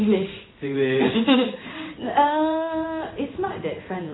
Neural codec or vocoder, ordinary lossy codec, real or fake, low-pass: codec, 16 kHz in and 24 kHz out, 1 kbps, XY-Tokenizer; AAC, 16 kbps; fake; 7.2 kHz